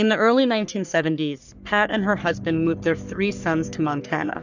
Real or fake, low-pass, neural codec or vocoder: fake; 7.2 kHz; codec, 44.1 kHz, 3.4 kbps, Pupu-Codec